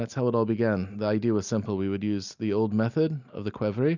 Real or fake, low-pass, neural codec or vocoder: real; 7.2 kHz; none